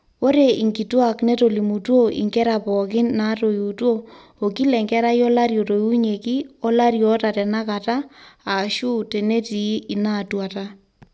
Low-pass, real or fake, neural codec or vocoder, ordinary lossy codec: none; real; none; none